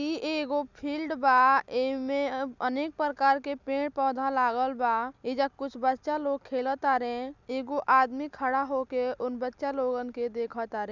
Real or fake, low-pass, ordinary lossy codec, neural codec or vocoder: real; 7.2 kHz; none; none